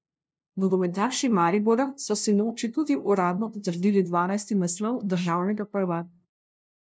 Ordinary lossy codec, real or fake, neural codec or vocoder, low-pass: none; fake; codec, 16 kHz, 0.5 kbps, FunCodec, trained on LibriTTS, 25 frames a second; none